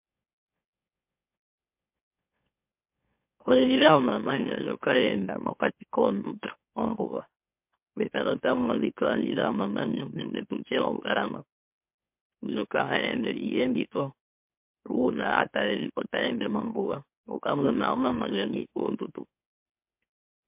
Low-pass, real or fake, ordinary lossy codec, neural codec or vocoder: 3.6 kHz; fake; MP3, 32 kbps; autoencoder, 44.1 kHz, a latent of 192 numbers a frame, MeloTTS